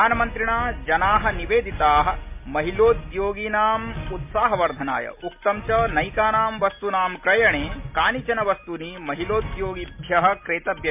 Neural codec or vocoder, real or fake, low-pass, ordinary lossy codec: none; real; 3.6 kHz; MP3, 32 kbps